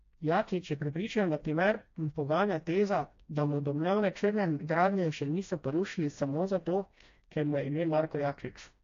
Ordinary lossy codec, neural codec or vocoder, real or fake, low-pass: AAC, 48 kbps; codec, 16 kHz, 1 kbps, FreqCodec, smaller model; fake; 7.2 kHz